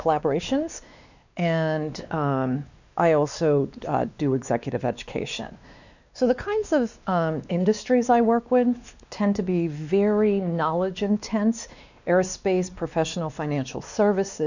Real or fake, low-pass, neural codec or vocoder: fake; 7.2 kHz; codec, 16 kHz, 2 kbps, X-Codec, WavLM features, trained on Multilingual LibriSpeech